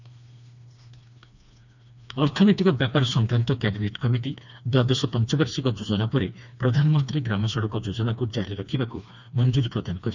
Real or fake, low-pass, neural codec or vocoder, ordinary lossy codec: fake; 7.2 kHz; codec, 16 kHz, 2 kbps, FreqCodec, smaller model; none